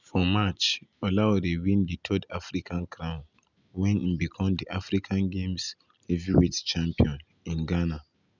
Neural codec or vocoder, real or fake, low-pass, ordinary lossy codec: none; real; 7.2 kHz; none